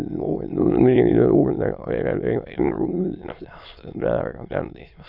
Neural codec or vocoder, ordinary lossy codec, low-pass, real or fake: autoencoder, 22.05 kHz, a latent of 192 numbers a frame, VITS, trained on many speakers; AAC, 48 kbps; 5.4 kHz; fake